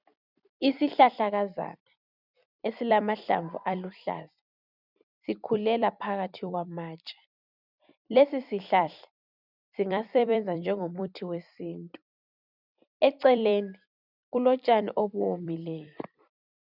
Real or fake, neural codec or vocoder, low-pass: fake; vocoder, 44.1 kHz, 128 mel bands every 256 samples, BigVGAN v2; 5.4 kHz